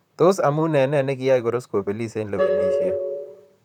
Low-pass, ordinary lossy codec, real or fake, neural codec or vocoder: 19.8 kHz; none; fake; vocoder, 44.1 kHz, 128 mel bands, Pupu-Vocoder